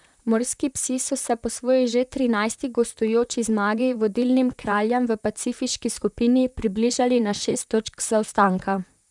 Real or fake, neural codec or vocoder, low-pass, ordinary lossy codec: fake; vocoder, 44.1 kHz, 128 mel bands, Pupu-Vocoder; 10.8 kHz; none